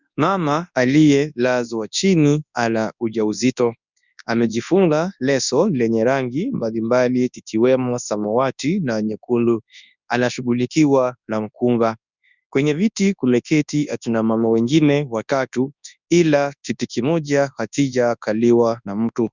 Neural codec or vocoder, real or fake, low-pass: codec, 24 kHz, 0.9 kbps, WavTokenizer, large speech release; fake; 7.2 kHz